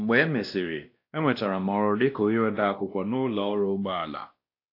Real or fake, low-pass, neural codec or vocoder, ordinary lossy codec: fake; 5.4 kHz; codec, 16 kHz, 1 kbps, X-Codec, WavLM features, trained on Multilingual LibriSpeech; AAC, 32 kbps